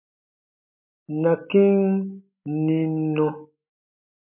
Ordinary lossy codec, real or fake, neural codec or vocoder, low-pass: MP3, 32 kbps; real; none; 3.6 kHz